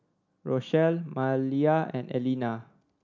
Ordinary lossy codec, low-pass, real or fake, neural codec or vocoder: none; 7.2 kHz; real; none